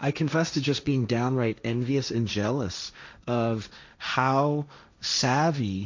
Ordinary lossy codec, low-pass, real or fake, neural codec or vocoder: MP3, 64 kbps; 7.2 kHz; fake; codec, 16 kHz, 1.1 kbps, Voila-Tokenizer